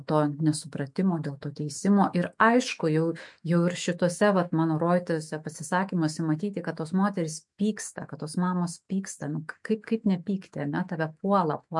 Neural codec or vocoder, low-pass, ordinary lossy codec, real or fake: autoencoder, 48 kHz, 128 numbers a frame, DAC-VAE, trained on Japanese speech; 10.8 kHz; MP3, 64 kbps; fake